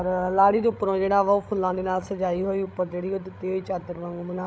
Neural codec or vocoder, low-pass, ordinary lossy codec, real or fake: codec, 16 kHz, 16 kbps, FreqCodec, larger model; none; none; fake